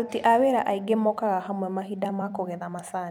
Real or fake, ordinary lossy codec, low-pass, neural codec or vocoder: fake; none; 19.8 kHz; vocoder, 44.1 kHz, 128 mel bands every 256 samples, BigVGAN v2